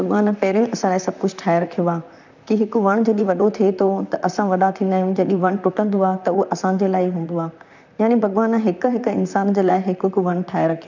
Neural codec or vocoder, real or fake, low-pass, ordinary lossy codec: vocoder, 44.1 kHz, 128 mel bands, Pupu-Vocoder; fake; 7.2 kHz; none